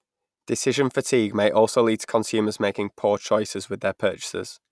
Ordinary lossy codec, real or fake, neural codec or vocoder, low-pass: none; real; none; none